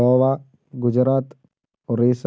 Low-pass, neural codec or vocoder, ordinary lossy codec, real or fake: none; none; none; real